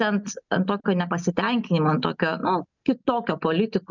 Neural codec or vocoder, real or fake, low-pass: none; real; 7.2 kHz